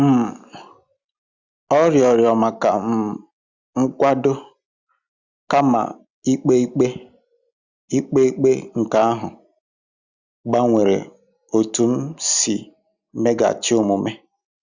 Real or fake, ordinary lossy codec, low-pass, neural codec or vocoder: real; Opus, 64 kbps; 7.2 kHz; none